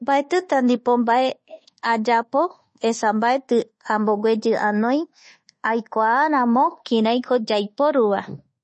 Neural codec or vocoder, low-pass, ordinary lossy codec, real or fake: codec, 24 kHz, 1.2 kbps, DualCodec; 10.8 kHz; MP3, 32 kbps; fake